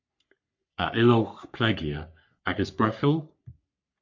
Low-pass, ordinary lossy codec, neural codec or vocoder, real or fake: 7.2 kHz; MP3, 48 kbps; codec, 44.1 kHz, 3.4 kbps, Pupu-Codec; fake